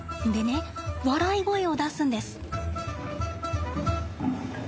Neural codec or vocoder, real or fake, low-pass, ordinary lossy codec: none; real; none; none